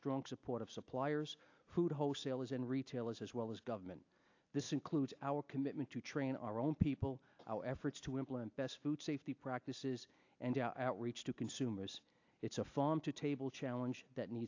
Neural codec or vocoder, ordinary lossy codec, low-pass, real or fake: none; AAC, 48 kbps; 7.2 kHz; real